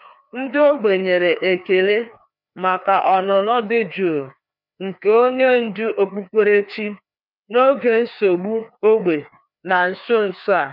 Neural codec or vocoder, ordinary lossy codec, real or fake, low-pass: codec, 16 kHz, 2 kbps, FreqCodec, larger model; none; fake; 5.4 kHz